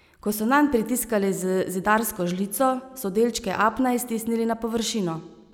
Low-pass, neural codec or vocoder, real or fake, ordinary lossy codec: none; none; real; none